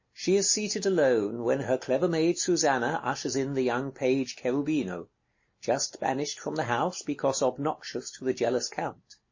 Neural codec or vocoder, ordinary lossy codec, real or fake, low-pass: none; MP3, 32 kbps; real; 7.2 kHz